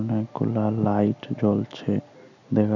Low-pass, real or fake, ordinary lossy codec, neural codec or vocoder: 7.2 kHz; real; none; none